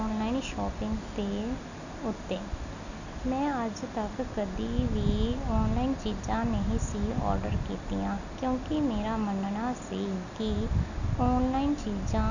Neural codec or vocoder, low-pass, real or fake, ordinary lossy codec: none; 7.2 kHz; real; none